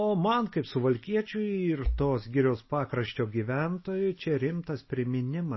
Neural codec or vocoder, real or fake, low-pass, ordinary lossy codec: none; real; 7.2 kHz; MP3, 24 kbps